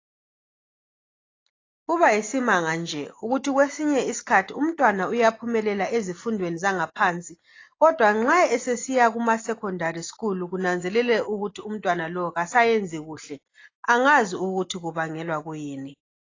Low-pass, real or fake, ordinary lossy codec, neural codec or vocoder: 7.2 kHz; real; AAC, 32 kbps; none